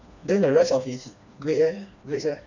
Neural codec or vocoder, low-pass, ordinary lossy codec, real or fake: codec, 16 kHz, 2 kbps, FreqCodec, smaller model; 7.2 kHz; none; fake